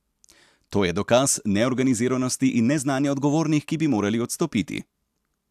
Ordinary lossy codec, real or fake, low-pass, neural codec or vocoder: AAC, 96 kbps; real; 14.4 kHz; none